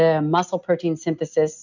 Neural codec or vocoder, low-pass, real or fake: none; 7.2 kHz; real